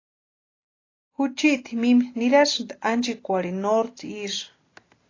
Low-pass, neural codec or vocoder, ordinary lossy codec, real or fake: 7.2 kHz; none; AAC, 32 kbps; real